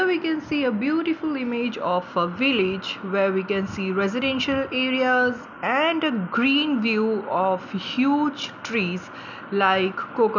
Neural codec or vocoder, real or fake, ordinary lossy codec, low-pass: none; real; none; 7.2 kHz